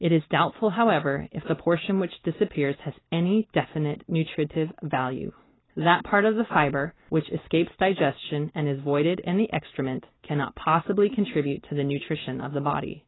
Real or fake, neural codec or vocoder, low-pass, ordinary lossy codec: real; none; 7.2 kHz; AAC, 16 kbps